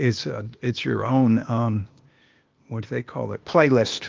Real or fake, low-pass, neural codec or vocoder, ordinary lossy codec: fake; 7.2 kHz; codec, 16 kHz, 0.8 kbps, ZipCodec; Opus, 32 kbps